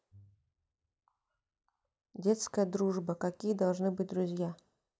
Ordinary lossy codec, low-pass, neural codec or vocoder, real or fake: none; none; none; real